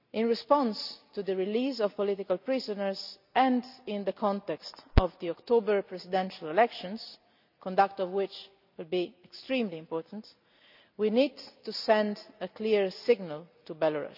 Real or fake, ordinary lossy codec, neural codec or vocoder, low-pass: real; none; none; 5.4 kHz